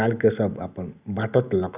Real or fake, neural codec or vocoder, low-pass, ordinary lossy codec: real; none; 3.6 kHz; Opus, 64 kbps